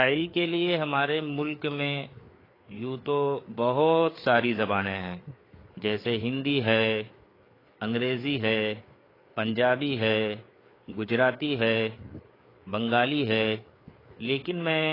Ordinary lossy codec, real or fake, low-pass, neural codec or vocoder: AAC, 24 kbps; fake; 5.4 kHz; codec, 16 kHz, 4 kbps, FreqCodec, larger model